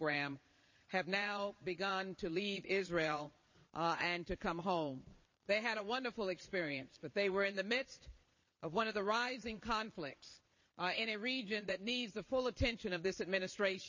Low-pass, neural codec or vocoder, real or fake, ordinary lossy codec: 7.2 kHz; vocoder, 22.05 kHz, 80 mel bands, Vocos; fake; MP3, 32 kbps